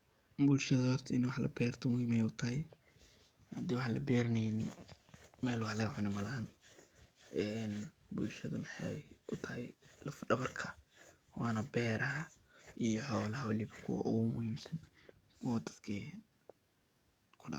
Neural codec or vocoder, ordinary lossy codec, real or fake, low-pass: codec, 44.1 kHz, 7.8 kbps, DAC; Opus, 64 kbps; fake; 19.8 kHz